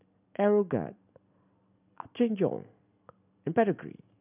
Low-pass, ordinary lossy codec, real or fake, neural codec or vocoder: 3.6 kHz; none; real; none